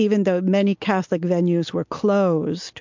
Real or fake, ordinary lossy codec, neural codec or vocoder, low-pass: real; MP3, 64 kbps; none; 7.2 kHz